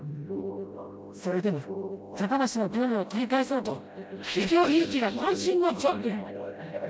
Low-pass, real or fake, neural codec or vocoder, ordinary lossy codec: none; fake; codec, 16 kHz, 0.5 kbps, FreqCodec, smaller model; none